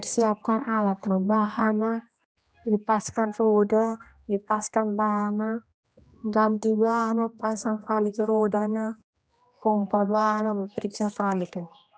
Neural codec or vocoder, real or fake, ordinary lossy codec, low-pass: codec, 16 kHz, 1 kbps, X-Codec, HuBERT features, trained on general audio; fake; none; none